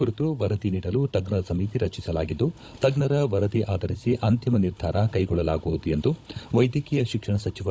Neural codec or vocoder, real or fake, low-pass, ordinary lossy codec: codec, 16 kHz, 16 kbps, FunCodec, trained on Chinese and English, 50 frames a second; fake; none; none